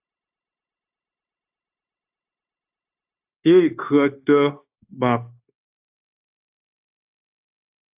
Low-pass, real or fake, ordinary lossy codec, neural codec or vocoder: 3.6 kHz; fake; AAC, 32 kbps; codec, 16 kHz, 0.9 kbps, LongCat-Audio-Codec